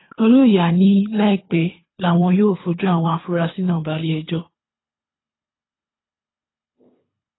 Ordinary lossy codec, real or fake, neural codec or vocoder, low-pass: AAC, 16 kbps; fake; codec, 24 kHz, 3 kbps, HILCodec; 7.2 kHz